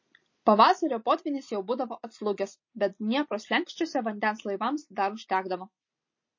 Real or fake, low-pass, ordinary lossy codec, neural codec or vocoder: real; 7.2 kHz; MP3, 32 kbps; none